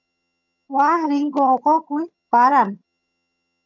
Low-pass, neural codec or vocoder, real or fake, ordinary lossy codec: 7.2 kHz; vocoder, 22.05 kHz, 80 mel bands, HiFi-GAN; fake; MP3, 64 kbps